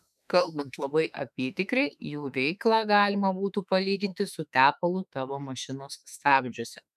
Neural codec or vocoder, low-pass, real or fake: autoencoder, 48 kHz, 32 numbers a frame, DAC-VAE, trained on Japanese speech; 14.4 kHz; fake